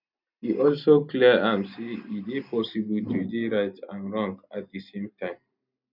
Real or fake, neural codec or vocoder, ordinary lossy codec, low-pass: real; none; none; 5.4 kHz